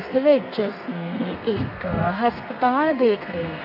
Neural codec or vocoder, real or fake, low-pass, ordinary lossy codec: codec, 32 kHz, 1.9 kbps, SNAC; fake; 5.4 kHz; none